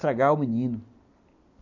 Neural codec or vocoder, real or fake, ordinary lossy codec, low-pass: none; real; none; 7.2 kHz